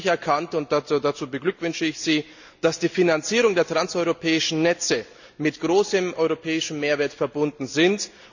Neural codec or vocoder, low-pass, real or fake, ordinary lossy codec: none; 7.2 kHz; real; none